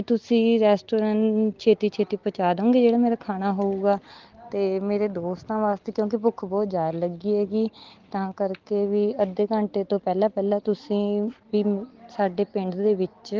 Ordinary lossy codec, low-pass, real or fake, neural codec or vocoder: Opus, 16 kbps; 7.2 kHz; real; none